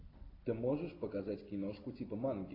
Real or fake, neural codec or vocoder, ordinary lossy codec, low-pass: real; none; AAC, 24 kbps; 5.4 kHz